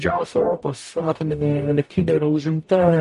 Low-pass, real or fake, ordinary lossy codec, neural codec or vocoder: 14.4 kHz; fake; MP3, 48 kbps; codec, 44.1 kHz, 0.9 kbps, DAC